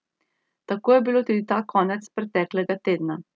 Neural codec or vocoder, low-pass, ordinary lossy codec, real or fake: none; none; none; real